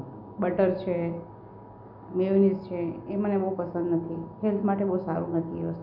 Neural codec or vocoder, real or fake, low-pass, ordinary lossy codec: none; real; 5.4 kHz; none